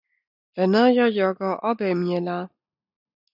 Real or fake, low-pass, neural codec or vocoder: real; 5.4 kHz; none